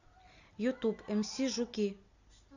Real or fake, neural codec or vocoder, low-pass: real; none; 7.2 kHz